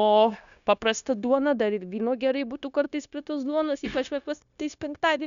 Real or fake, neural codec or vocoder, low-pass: fake; codec, 16 kHz, 0.9 kbps, LongCat-Audio-Codec; 7.2 kHz